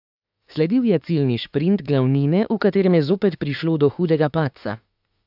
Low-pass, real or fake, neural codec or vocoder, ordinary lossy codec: 5.4 kHz; fake; autoencoder, 48 kHz, 32 numbers a frame, DAC-VAE, trained on Japanese speech; AAC, 48 kbps